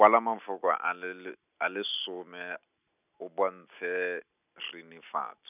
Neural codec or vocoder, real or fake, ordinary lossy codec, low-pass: none; real; none; 3.6 kHz